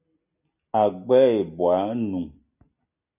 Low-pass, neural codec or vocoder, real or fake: 3.6 kHz; none; real